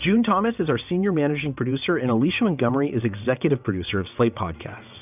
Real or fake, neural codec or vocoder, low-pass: fake; vocoder, 22.05 kHz, 80 mel bands, WaveNeXt; 3.6 kHz